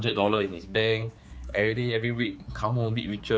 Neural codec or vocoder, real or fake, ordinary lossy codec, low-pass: codec, 16 kHz, 4 kbps, X-Codec, HuBERT features, trained on general audio; fake; none; none